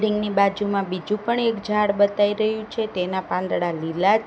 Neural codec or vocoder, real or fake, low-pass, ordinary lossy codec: none; real; none; none